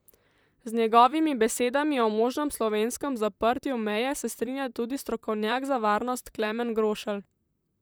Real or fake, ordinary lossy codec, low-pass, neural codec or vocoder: fake; none; none; vocoder, 44.1 kHz, 128 mel bands, Pupu-Vocoder